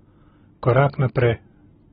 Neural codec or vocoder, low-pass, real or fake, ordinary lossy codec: none; 19.8 kHz; real; AAC, 16 kbps